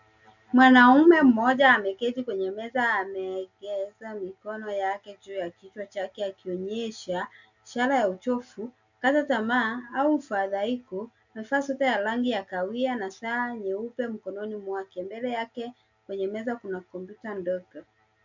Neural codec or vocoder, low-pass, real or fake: none; 7.2 kHz; real